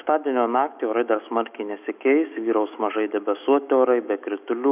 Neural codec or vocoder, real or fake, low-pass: autoencoder, 48 kHz, 128 numbers a frame, DAC-VAE, trained on Japanese speech; fake; 3.6 kHz